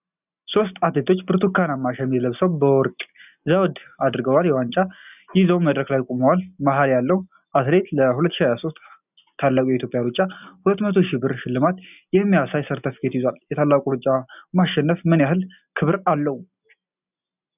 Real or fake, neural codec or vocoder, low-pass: real; none; 3.6 kHz